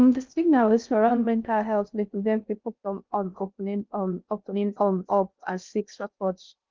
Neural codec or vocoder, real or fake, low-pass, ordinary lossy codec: codec, 16 kHz in and 24 kHz out, 0.6 kbps, FocalCodec, streaming, 2048 codes; fake; 7.2 kHz; Opus, 32 kbps